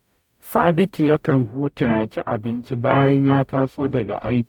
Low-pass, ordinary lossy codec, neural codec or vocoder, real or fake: 19.8 kHz; none; codec, 44.1 kHz, 0.9 kbps, DAC; fake